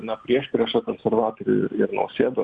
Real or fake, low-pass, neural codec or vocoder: real; 9.9 kHz; none